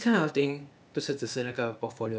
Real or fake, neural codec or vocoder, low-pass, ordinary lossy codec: fake; codec, 16 kHz, 0.8 kbps, ZipCodec; none; none